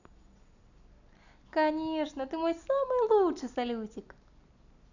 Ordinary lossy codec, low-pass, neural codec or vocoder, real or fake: none; 7.2 kHz; none; real